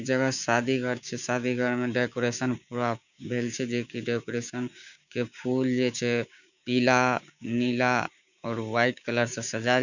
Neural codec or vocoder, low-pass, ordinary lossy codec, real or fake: codec, 16 kHz, 6 kbps, DAC; 7.2 kHz; none; fake